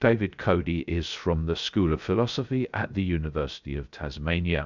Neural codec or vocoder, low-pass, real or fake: codec, 16 kHz, 0.3 kbps, FocalCodec; 7.2 kHz; fake